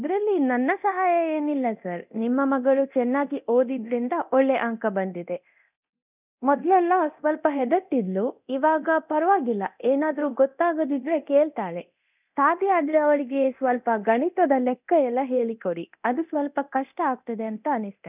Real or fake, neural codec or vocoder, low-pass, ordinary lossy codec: fake; codec, 24 kHz, 0.9 kbps, DualCodec; 3.6 kHz; none